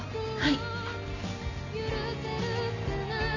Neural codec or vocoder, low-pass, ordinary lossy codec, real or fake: none; 7.2 kHz; none; real